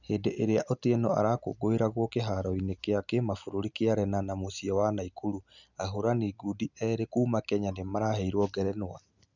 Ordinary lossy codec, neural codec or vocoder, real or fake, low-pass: none; none; real; 7.2 kHz